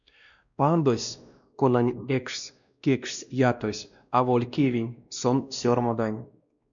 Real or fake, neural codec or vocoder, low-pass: fake; codec, 16 kHz, 1 kbps, X-Codec, WavLM features, trained on Multilingual LibriSpeech; 7.2 kHz